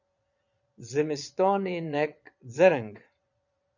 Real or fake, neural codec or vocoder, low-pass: fake; vocoder, 22.05 kHz, 80 mel bands, Vocos; 7.2 kHz